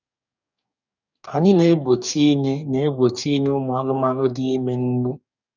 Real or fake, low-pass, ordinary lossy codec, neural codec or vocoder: fake; 7.2 kHz; none; codec, 44.1 kHz, 2.6 kbps, DAC